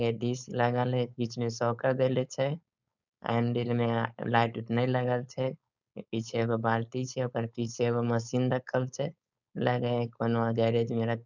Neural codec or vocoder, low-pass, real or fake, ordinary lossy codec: codec, 16 kHz, 4.8 kbps, FACodec; 7.2 kHz; fake; none